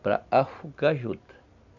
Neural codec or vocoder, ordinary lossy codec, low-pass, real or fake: none; none; 7.2 kHz; real